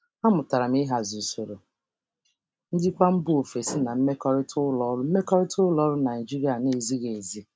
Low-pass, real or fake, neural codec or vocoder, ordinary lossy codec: none; real; none; none